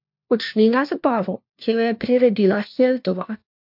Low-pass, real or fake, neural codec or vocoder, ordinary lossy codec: 5.4 kHz; fake; codec, 16 kHz, 1 kbps, FunCodec, trained on LibriTTS, 50 frames a second; MP3, 48 kbps